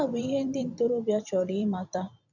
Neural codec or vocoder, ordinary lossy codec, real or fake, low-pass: vocoder, 44.1 kHz, 128 mel bands every 256 samples, BigVGAN v2; none; fake; 7.2 kHz